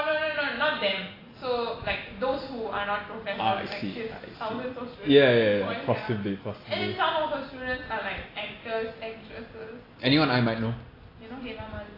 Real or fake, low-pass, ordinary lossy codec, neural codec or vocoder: real; 5.4 kHz; AAC, 24 kbps; none